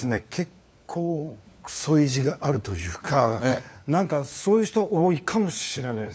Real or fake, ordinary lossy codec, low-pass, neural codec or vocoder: fake; none; none; codec, 16 kHz, 4 kbps, FunCodec, trained on LibriTTS, 50 frames a second